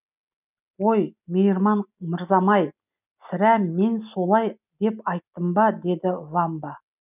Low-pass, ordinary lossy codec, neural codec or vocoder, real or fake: 3.6 kHz; none; none; real